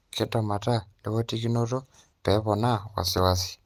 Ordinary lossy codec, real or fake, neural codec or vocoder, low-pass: Opus, 32 kbps; real; none; 14.4 kHz